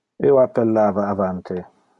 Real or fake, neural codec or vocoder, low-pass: real; none; 10.8 kHz